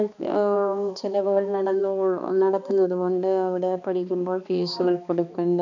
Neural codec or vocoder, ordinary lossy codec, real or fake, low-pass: codec, 16 kHz, 2 kbps, X-Codec, HuBERT features, trained on balanced general audio; none; fake; 7.2 kHz